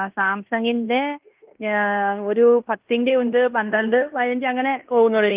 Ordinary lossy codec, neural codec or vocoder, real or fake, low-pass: Opus, 32 kbps; codec, 16 kHz in and 24 kHz out, 0.9 kbps, LongCat-Audio-Codec, fine tuned four codebook decoder; fake; 3.6 kHz